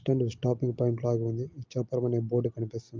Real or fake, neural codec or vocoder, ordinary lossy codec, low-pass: real; none; Opus, 32 kbps; 7.2 kHz